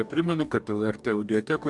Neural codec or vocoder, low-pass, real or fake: codec, 44.1 kHz, 2.6 kbps, DAC; 10.8 kHz; fake